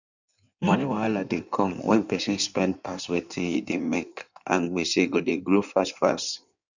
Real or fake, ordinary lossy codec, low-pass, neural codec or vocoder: fake; none; 7.2 kHz; codec, 16 kHz in and 24 kHz out, 1.1 kbps, FireRedTTS-2 codec